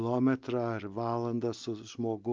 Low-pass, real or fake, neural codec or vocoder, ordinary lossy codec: 7.2 kHz; real; none; Opus, 24 kbps